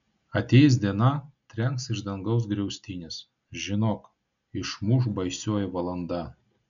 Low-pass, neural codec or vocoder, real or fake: 7.2 kHz; none; real